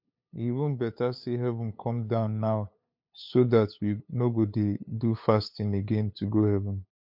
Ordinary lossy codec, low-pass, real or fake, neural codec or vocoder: MP3, 48 kbps; 5.4 kHz; fake; codec, 16 kHz, 8 kbps, FunCodec, trained on LibriTTS, 25 frames a second